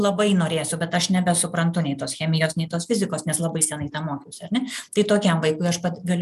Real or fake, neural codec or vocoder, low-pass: real; none; 14.4 kHz